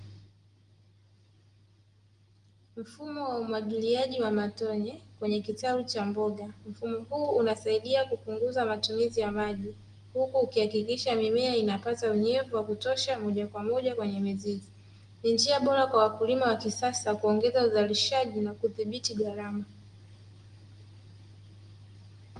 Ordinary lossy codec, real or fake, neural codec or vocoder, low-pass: Opus, 24 kbps; real; none; 9.9 kHz